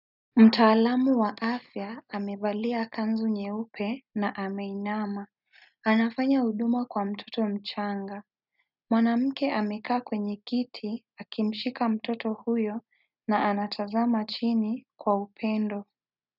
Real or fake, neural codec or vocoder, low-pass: real; none; 5.4 kHz